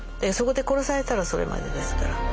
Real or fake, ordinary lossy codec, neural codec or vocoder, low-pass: real; none; none; none